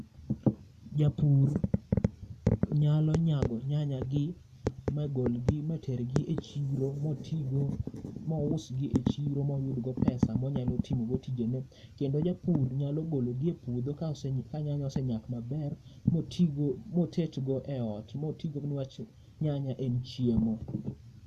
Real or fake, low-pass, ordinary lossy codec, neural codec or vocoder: real; 14.4 kHz; none; none